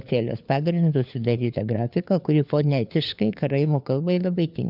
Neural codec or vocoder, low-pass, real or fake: codec, 16 kHz, 4 kbps, FunCodec, trained on LibriTTS, 50 frames a second; 5.4 kHz; fake